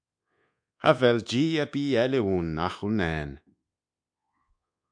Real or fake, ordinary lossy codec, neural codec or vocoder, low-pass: fake; MP3, 64 kbps; codec, 24 kHz, 1.2 kbps, DualCodec; 9.9 kHz